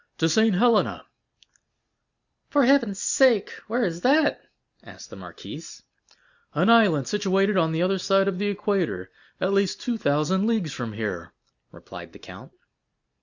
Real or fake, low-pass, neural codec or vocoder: real; 7.2 kHz; none